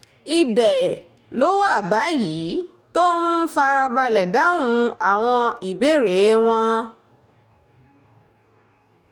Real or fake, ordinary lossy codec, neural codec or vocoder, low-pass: fake; none; codec, 44.1 kHz, 2.6 kbps, DAC; 19.8 kHz